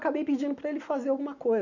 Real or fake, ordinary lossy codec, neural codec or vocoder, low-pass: real; none; none; 7.2 kHz